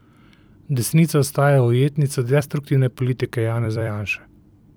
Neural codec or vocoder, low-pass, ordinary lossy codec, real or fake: vocoder, 44.1 kHz, 128 mel bands every 256 samples, BigVGAN v2; none; none; fake